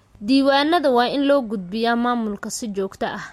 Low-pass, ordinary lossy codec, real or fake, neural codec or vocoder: 19.8 kHz; MP3, 64 kbps; real; none